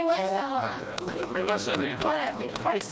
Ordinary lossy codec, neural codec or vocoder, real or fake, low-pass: none; codec, 16 kHz, 1 kbps, FreqCodec, smaller model; fake; none